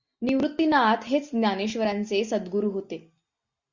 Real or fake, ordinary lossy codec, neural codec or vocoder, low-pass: real; Opus, 64 kbps; none; 7.2 kHz